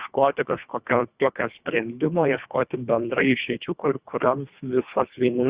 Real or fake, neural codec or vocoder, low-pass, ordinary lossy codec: fake; codec, 24 kHz, 1.5 kbps, HILCodec; 3.6 kHz; Opus, 64 kbps